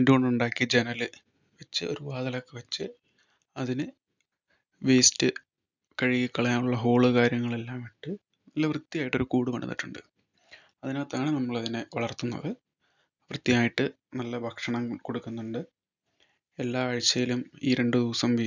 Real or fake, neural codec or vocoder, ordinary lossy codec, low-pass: real; none; none; 7.2 kHz